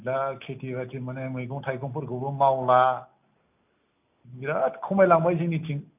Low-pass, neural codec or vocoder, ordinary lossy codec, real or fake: 3.6 kHz; none; none; real